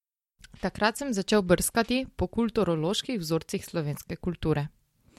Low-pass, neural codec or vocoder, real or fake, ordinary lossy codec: 19.8 kHz; none; real; MP3, 64 kbps